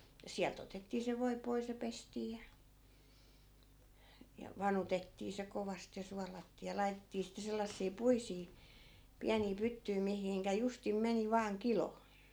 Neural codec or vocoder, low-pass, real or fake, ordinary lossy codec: none; none; real; none